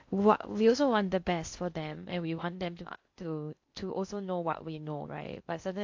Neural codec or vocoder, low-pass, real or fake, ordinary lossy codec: codec, 16 kHz in and 24 kHz out, 0.6 kbps, FocalCodec, streaming, 2048 codes; 7.2 kHz; fake; AAC, 48 kbps